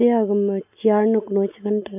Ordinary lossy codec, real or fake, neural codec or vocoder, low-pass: none; real; none; 3.6 kHz